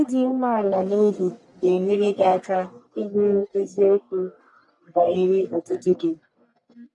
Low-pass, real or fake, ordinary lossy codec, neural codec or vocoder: 10.8 kHz; fake; none; codec, 44.1 kHz, 1.7 kbps, Pupu-Codec